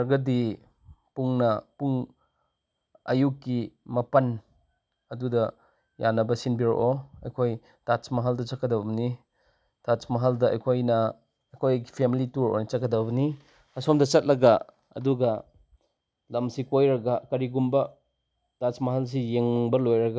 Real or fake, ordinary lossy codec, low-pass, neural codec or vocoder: real; none; none; none